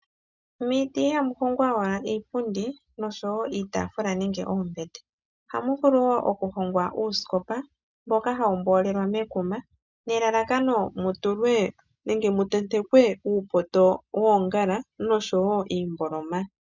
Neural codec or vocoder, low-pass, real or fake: none; 7.2 kHz; real